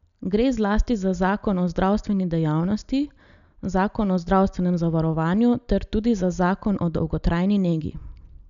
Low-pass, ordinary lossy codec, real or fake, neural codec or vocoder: 7.2 kHz; none; real; none